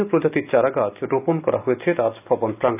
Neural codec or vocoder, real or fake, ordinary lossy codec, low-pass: none; real; none; 3.6 kHz